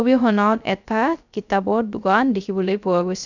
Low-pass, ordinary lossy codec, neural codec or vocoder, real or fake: 7.2 kHz; none; codec, 16 kHz, 0.3 kbps, FocalCodec; fake